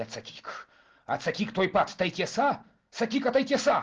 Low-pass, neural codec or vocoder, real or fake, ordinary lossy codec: 7.2 kHz; none; real; Opus, 16 kbps